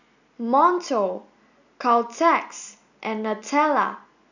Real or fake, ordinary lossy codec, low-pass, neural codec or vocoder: real; none; 7.2 kHz; none